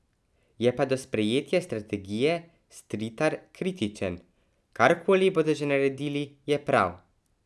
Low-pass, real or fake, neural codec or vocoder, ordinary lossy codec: none; real; none; none